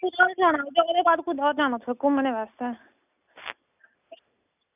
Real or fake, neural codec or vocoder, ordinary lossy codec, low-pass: real; none; none; 3.6 kHz